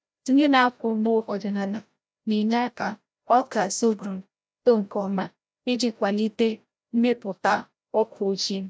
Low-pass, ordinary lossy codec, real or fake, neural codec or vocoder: none; none; fake; codec, 16 kHz, 0.5 kbps, FreqCodec, larger model